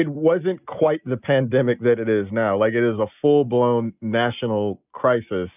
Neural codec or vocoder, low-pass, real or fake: none; 3.6 kHz; real